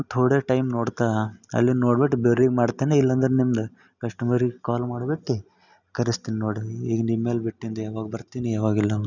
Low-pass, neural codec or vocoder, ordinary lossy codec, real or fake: 7.2 kHz; none; none; real